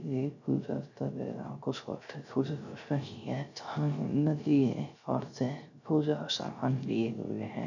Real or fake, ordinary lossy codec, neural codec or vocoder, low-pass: fake; none; codec, 16 kHz, 0.3 kbps, FocalCodec; 7.2 kHz